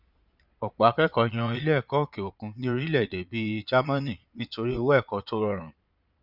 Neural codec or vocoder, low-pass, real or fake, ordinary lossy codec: vocoder, 22.05 kHz, 80 mel bands, Vocos; 5.4 kHz; fake; none